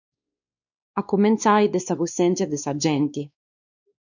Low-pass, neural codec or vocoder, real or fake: 7.2 kHz; codec, 16 kHz, 2 kbps, X-Codec, WavLM features, trained on Multilingual LibriSpeech; fake